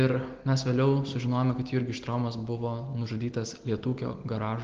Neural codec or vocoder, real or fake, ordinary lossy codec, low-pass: none; real; Opus, 16 kbps; 7.2 kHz